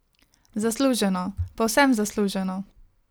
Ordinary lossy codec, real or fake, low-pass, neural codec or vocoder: none; real; none; none